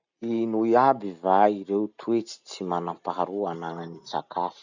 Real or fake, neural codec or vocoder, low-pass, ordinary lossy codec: real; none; 7.2 kHz; none